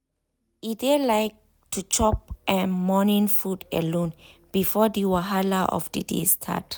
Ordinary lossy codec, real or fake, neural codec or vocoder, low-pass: none; real; none; none